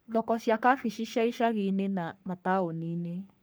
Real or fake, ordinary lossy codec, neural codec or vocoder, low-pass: fake; none; codec, 44.1 kHz, 3.4 kbps, Pupu-Codec; none